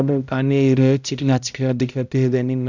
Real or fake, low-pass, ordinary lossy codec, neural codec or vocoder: fake; 7.2 kHz; none; codec, 16 kHz, 0.5 kbps, X-Codec, HuBERT features, trained on balanced general audio